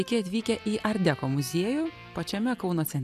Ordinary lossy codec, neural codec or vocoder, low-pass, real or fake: AAC, 96 kbps; none; 14.4 kHz; real